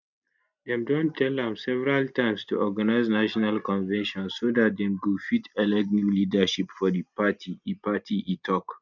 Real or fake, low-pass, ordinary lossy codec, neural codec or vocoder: real; 7.2 kHz; none; none